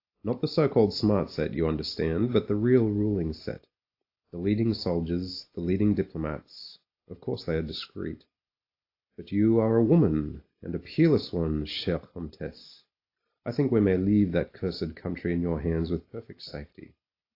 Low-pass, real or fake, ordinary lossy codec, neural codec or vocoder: 5.4 kHz; real; AAC, 32 kbps; none